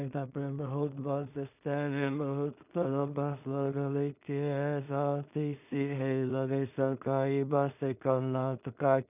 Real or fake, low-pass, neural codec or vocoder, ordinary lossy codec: fake; 3.6 kHz; codec, 16 kHz in and 24 kHz out, 0.4 kbps, LongCat-Audio-Codec, two codebook decoder; none